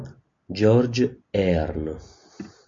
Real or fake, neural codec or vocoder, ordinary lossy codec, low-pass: real; none; MP3, 48 kbps; 7.2 kHz